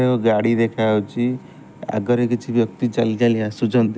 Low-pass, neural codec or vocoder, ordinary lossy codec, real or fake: none; none; none; real